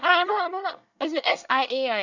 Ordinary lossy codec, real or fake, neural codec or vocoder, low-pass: none; fake; codec, 24 kHz, 1 kbps, SNAC; 7.2 kHz